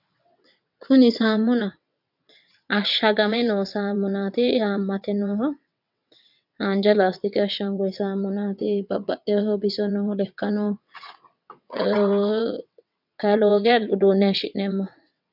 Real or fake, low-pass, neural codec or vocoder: fake; 5.4 kHz; vocoder, 22.05 kHz, 80 mel bands, WaveNeXt